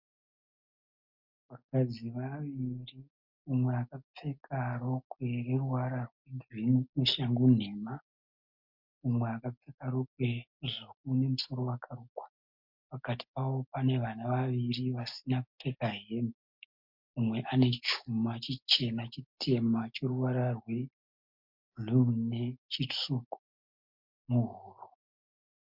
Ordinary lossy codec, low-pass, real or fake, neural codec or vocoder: MP3, 48 kbps; 5.4 kHz; real; none